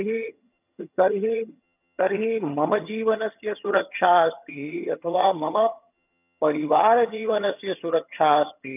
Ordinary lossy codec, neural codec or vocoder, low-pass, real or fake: none; vocoder, 22.05 kHz, 80 mel bands, HiFi-GAN; 3.6 kHz; fake